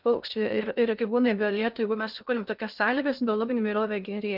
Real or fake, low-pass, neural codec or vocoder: fake; 5.4 kHz; codec, 16 kHz in and 24 kHz out, 0.6 kbps, FocalCodec, streaming, 2048 codes